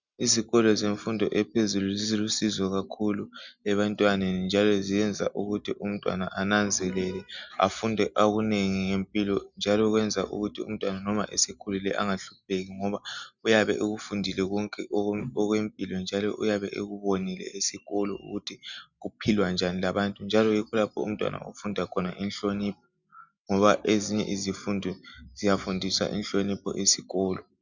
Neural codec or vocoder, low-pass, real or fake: none; 7.2 kHz; real